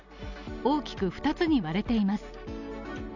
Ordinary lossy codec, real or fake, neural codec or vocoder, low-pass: none; real; none; 7.2 kHz